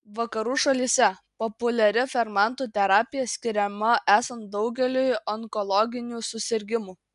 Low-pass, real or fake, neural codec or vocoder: 10.8 kHz; real; none